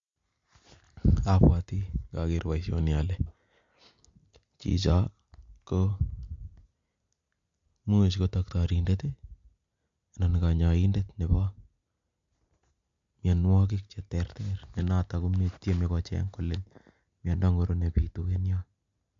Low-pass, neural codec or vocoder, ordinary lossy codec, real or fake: 7.2 kHz; none; MP3, 48 kbps; real